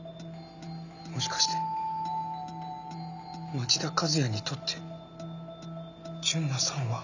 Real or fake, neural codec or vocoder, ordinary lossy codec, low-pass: real; none; none; 7.2 kHz